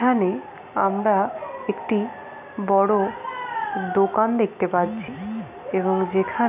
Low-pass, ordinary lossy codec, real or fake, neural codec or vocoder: 3.6 kHz; none; real; none